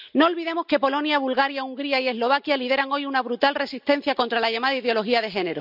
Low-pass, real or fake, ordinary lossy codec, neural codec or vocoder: 5.4 kHz; real; none; none